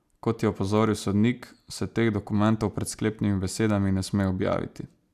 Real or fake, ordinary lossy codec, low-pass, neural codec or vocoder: real; none; 14.4 kHz; none